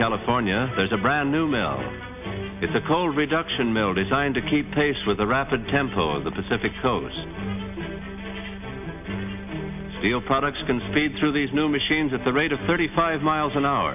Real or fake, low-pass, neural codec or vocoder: real; 3.6 kHz; none